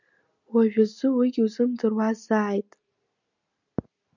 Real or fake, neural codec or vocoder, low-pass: real; none; 7.2 kHz